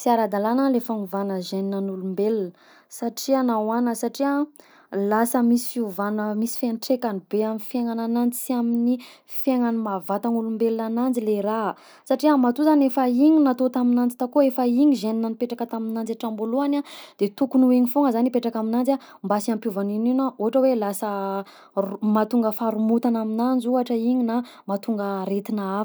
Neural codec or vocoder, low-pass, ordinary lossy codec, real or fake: none; none; none; real